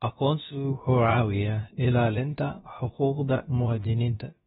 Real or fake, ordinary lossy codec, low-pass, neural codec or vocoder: fake; AAC, 16 kbps; 7.2 kHz; codec, 16 kHz, about 1 kbps, DyCAST, with the encoder's durations